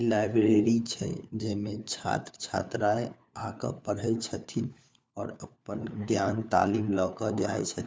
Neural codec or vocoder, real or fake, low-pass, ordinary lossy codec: codec, 16 kHz, 4 kbps, FunCodec, trained on LibriTTS, 50 frames a second; fake; none; none